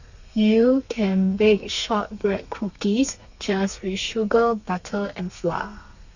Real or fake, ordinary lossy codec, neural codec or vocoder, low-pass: fake; none; codec, 32 kHz, 1.9 kbps, SNAC; 7.2 kHz